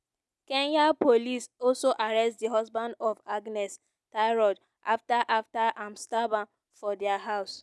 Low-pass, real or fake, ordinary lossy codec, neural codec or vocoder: none; real; none; none